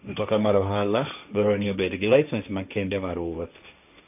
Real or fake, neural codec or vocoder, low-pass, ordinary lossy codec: fake; codec, 16 kHz, 1.1 kbps, Voila-Tokenizer; 3.6 kHz; none